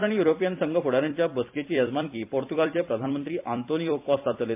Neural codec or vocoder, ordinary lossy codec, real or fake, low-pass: vocoder, 44.1 kHz, 128 mel bands every 256 samples, BigVGAN v2; MP3, 32 kbps; fake; 3.6 kHz